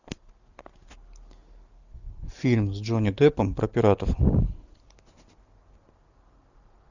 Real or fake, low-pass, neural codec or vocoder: real; 7.2 kHz; none